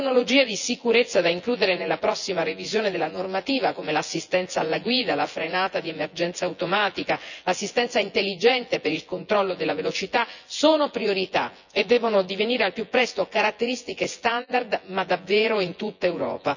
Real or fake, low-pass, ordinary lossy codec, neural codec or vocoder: fake; 7.2 kHz; none; vocoder, 24 kHz, 100 mel bands, Vocos